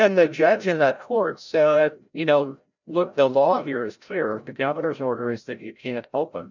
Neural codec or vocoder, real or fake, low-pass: codec, 16 kHz, 0.5 kbps, FreqCodec, larger model; fake; 7.2 kHz